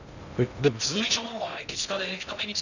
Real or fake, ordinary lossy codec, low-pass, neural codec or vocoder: fake; none; 7.2 kHz; codec, 16 kHz in and 24 kHz out, 0.6 kbps, FocalCodec, streaming, 2048 codes